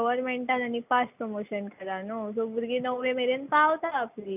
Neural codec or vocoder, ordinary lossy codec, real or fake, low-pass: none; none; real; 3.6 kHz